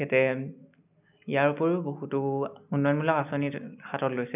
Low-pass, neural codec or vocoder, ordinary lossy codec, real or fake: 3.6 kHz; none; none; real